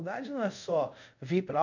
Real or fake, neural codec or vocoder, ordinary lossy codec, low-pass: fake; codec, 24 kHz, 0.5 kbps, DualCodec; none; 7.2 kHz